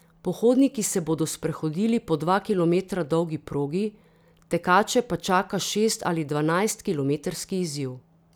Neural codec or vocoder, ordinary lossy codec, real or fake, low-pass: none; none; real; none